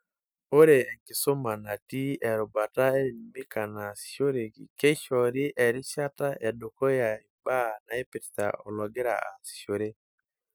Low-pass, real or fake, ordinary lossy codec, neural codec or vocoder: none; real; none; none